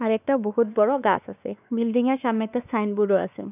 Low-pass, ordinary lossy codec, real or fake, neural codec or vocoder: 3.6 kHz; none; fake; codec, 16 kHz, 2 kbps, X-Codec, WavLM features, trained on Multilingual LibriSpeech